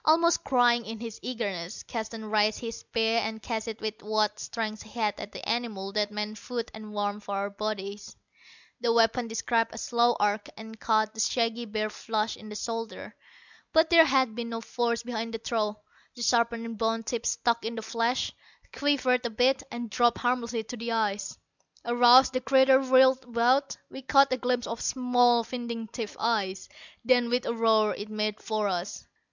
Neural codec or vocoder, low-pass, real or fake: none; 7.2 kHz; real